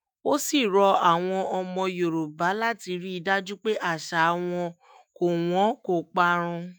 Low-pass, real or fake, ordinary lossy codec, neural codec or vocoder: none; fake; none; autoencoder, 48 kHz, 128 numbers a frame, DAC-VAE, trained on Japanese speech